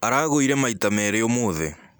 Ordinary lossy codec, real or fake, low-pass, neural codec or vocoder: none; real; none; none